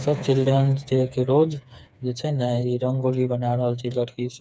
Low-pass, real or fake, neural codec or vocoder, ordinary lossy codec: none; fake; codec, 16 kHz, 4 kbps, FreqCodec, smaller model; none